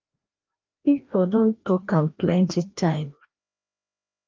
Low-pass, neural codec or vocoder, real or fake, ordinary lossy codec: 7.2 kHz; codec, 16 kHz, 1 kbps, FreqCodec, larger model; fake; Opus, 24 kbps